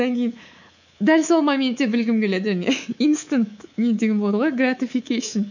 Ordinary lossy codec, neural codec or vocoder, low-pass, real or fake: none; codec, 24 kHz, 3.1 kbps, DualCodec; 7.2 kHz; fake